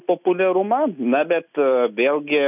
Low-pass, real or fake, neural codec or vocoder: 3.6 kHz; real; none